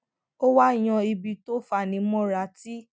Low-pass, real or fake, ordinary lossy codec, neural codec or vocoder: none; real; none; none